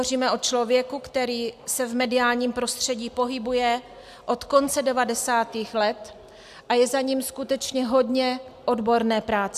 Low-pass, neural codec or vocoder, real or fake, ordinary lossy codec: 14.4 kHz; none; real; AAC, 96 kbps